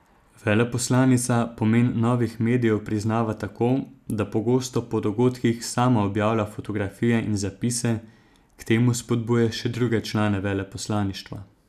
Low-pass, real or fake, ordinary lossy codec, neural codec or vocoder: 14.4 kHz; real; none; none